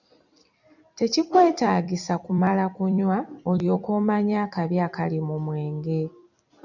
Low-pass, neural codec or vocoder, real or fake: 7.2 kHz; vocoder, 44.1 kHz, 128 mel bands every 256 samples, BigVGAN v2; fake